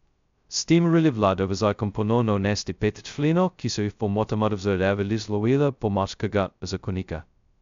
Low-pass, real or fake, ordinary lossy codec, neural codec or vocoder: 7.2 kHz; fake; MP3, 64 kbps; codec, 16 kHz, 0.2 kbps, FocalCodec